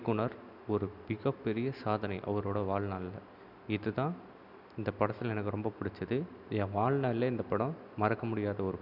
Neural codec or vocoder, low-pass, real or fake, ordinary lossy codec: none; 5.4 kHz; real; none